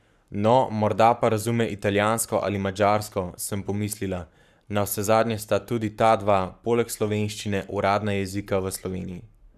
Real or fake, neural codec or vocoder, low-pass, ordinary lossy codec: fake; codec, 44.1 kHz, 7.8 kbps, Pupu-Codec; 14.4 kHz; none